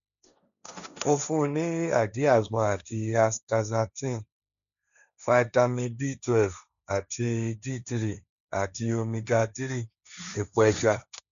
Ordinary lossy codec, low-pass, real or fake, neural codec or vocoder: none; 7.2 kHz; fake; codec, 16 kHz, 1.1 kbps, Voila-Tokenizer